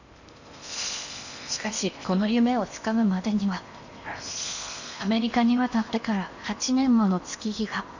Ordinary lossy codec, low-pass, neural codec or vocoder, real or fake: none; 7.2 kHz; codec, 16 kHz in and 24 kHz out, 0.8 kbps, FocalCodec, streaming, 65536 codes; fake